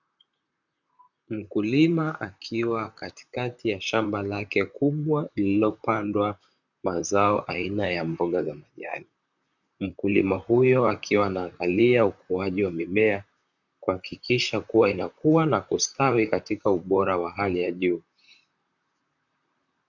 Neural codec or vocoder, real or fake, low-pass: vocoder, 44.1 kHz, 128 mel bands, Pupu-Vocoder; fake; 7.2 kHz